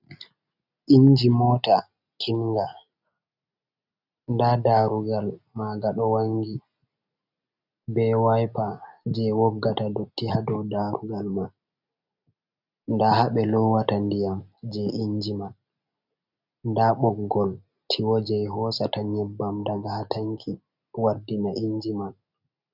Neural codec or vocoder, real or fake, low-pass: none; real; 5.4 kHz